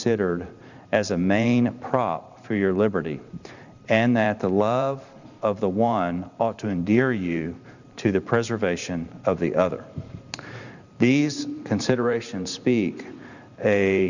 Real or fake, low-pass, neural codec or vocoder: fake; 7.2 kHz; vocoder, 44.1 kHz, 128 mel bands every 512 samples, BigVGAN v2